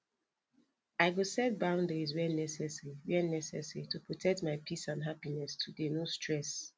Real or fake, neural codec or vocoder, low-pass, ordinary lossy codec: real; none; none; none